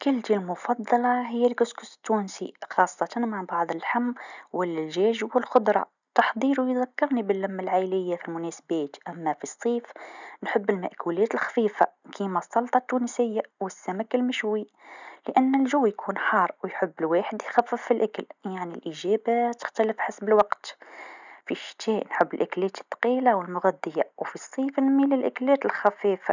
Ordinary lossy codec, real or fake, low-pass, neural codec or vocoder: none; real; 7.2 kHz; none